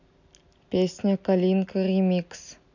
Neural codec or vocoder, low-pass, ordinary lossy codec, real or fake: none; 7.2 kHz; none; real